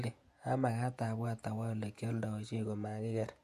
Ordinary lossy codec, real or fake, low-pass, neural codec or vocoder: MP3, 64 kbps; fake; 14.4 kHz; vocoder, 48 kHz, 128 mel bands, Vocos